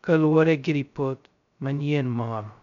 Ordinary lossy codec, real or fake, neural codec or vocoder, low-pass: none; fake; codec, 16 kHz, 0.2 kbps, FocalCodec; 7.2 kHz